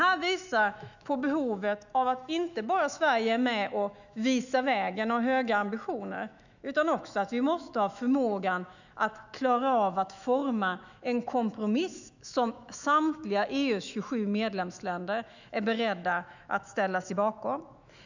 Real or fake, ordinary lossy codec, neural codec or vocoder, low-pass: fake; none; autoencoder, 48 kHz, 128 numbers a frame, DAC-VAE, trained on Japanese speech; 7.2 kHz